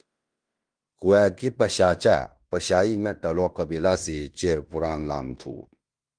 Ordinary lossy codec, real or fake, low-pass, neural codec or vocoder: Opus, 32 kbps; fake; 9.9 kHz; codec, 16 kHz in and 24 kHz out, 0.9 kbps, LongCat-Audio-Codec, fine tuned four codebook decoder